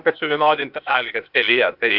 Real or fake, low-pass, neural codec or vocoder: fake; 5.4 kHz; codec, 16 kHz, 0.8 kbps, ZipCodec